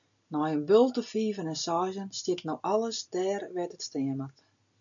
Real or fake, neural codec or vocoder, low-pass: real; none; 7.2 kHz